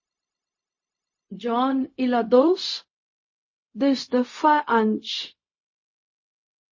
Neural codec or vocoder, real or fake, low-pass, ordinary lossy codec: codec, 16 kHz, 0.4 kbps, LongCat-Audio-Codec; fake; 7.2 kHz; MP3, 32 kbps